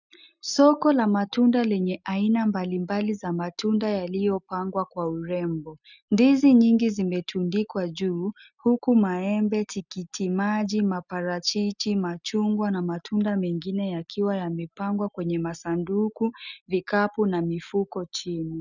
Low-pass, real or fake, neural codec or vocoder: 7.2 kHz; real; none